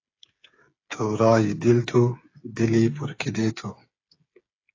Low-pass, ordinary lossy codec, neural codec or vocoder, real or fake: 7.2 kHz; AAC, 32 kbps; codec, 16 kHz, 8 kbps, FreqCodec, smaller model; fake